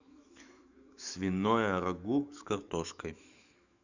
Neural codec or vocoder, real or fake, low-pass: autoencoder, 48 kHz, 128 numbers a frame, DAC-VAE, trained on Japanese speech; fake; 7.2 kHz